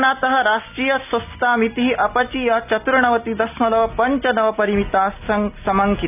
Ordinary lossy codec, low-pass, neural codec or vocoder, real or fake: none; 3.6 kHz; none; real